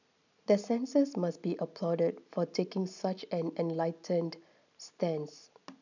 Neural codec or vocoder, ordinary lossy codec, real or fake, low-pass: none; none; real; 7.2 kHz